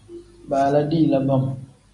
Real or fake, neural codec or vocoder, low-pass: real; none; 10.8 kHz